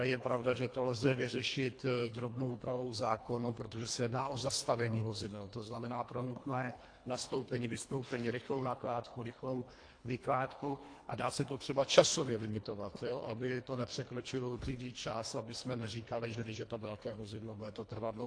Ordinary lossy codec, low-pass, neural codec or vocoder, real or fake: AAC, 48 kbps; 9.9 kHz; codec, 24 kHz, 1.5 kbps, HILCodec; fake